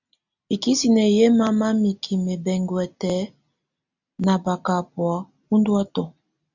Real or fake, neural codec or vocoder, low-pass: real; none; 7.2 kHz